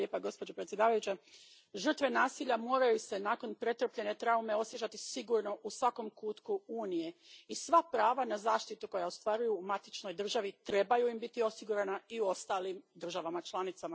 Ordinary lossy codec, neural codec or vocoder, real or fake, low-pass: none; none; real; none